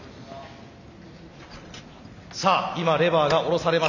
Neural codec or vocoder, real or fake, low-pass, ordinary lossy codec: none; real; 7.2 kHz; none